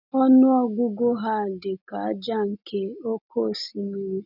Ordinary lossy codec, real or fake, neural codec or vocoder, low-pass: none; real; none; 5.4 kHz